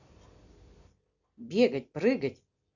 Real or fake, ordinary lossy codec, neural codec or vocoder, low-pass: real; none; none; 7.2 kHz